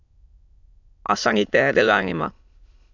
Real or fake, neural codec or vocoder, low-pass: fake; autoencoder, 22.05 kHz, a latent of 192 numbers a frame, VITS, trained on many speakers; 7.2 kHz